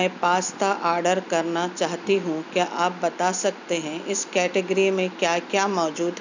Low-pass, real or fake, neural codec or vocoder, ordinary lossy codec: 7.2 kHz; real; none; none